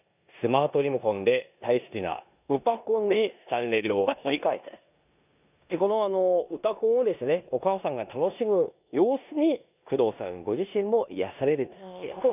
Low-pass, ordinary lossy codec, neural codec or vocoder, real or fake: 3.6 kHz; none; codec, 16 kHz in and 24 kHz out, 0.9 kbps, LongCat-Audio-Codec, four codebook decoder; fake